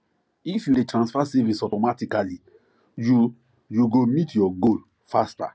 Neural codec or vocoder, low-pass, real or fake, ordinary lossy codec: none; none; real; none